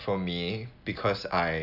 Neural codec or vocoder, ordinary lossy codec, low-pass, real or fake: none; none; 5.4 kHz; real